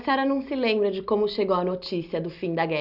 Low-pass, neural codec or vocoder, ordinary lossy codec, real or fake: 5.4 kHz; none; none; real